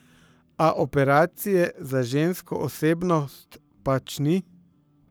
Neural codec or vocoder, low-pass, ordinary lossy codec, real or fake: codec, 44.1 kHz, 7.8 kbps, Pupu-Codec; none; none; fake